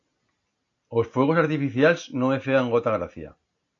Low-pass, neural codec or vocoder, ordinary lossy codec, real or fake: 7.2 kHz; none; AAC, 48 kbps; real